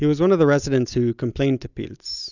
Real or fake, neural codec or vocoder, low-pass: real; none; 7.2 kHz